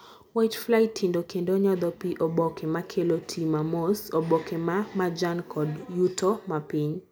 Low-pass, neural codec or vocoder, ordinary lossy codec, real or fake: none; none; none; real